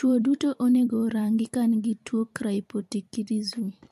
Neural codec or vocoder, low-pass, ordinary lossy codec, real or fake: vocoder, 44.1 kHz, 128 mel bands every 256 samples, BigVGAN v2; 14.4 kHz; MP3, 64 kbps; fake